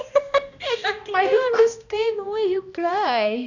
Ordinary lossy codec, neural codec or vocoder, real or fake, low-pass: none; codec, 16 kHz, 1 kbps, X-Codec, HuBERT features, trained on balanced general audio; fake; 7.2 kHz